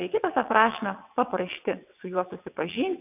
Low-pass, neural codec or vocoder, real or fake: 3.6 kHz; vocoder, 22.05 kHz, 80 mel bands, WaveNeXt; fake